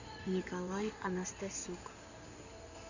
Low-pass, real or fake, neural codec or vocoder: 7.2 kHz; fake; codec, 16 kHz in and 24 kHz out, 2.2 kbps, FireRedTTS-2 codec